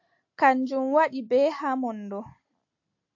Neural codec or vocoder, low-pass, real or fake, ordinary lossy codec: none; 7.2 kHz; real; AAC, 48 kbps